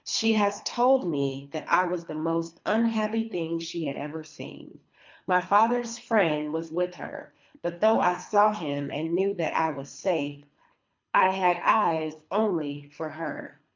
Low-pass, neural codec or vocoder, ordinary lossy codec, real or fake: 7.2 kHz; codec, 24 kHz, 3 kbps, HILCodec; MP3, 64 kbps; fake